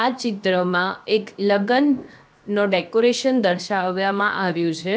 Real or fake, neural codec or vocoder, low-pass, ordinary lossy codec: fake; codec, 16 kHz, 0.7 kbps, FocalCodec; none; none